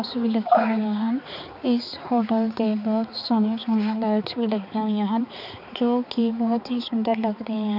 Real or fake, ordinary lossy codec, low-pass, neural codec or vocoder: fake; none; 5.4 kHz; codec, 16 kHz, 4 kbps, X-Codec, HuBERT features, trained on balanced general audio